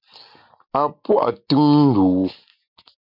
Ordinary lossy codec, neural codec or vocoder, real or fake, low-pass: MP3, 48 kbps; none; real; 5.4 kHz